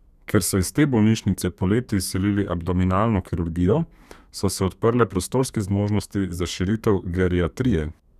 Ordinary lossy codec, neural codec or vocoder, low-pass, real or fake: none; codec, 32 kHz, 1.9 kbps, SNAC; 14.4 kHz; fake